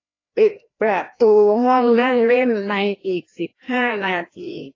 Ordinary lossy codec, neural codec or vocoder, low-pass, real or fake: AAC, 32 kbps; codec, 16 kHz, 1 kbps, FreqCodec, larger model; 7.2 kHz; fake